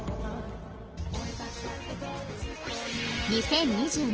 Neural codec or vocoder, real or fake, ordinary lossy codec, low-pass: none; real; Opus, 16 kbps; 7.2 kHz